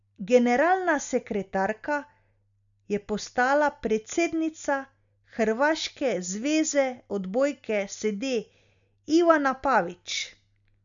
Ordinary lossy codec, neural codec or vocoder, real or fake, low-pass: none; none; real; 7.2 kHz